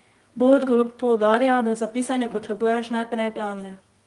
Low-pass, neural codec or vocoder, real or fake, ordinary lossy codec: 10.8 kHz; codec, 24 kHz, 0.9 kbps, WavTokenizer, medium music audio release; fake; Opus, 32 kbps